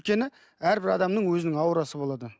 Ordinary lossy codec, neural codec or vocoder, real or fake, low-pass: none; none; real; none